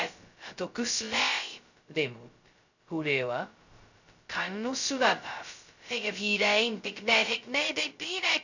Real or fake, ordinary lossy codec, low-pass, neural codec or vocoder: fake; none; 7.2 kHz; codec, 16 kHz, 0.2 kbps, FocalCodec